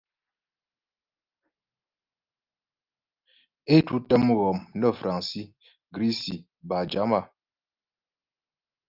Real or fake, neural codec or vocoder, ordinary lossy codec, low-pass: real; none; Opus, 32 kbps; 5.4 kHz